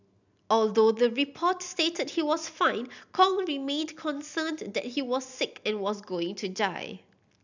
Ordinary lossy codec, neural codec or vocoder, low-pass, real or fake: none; none; 7.2 kHz; real